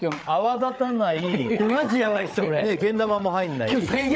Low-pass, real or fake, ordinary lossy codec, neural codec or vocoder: none; fake; none; codec, 16 kHz, 8 kbps, FreqCodec, larger model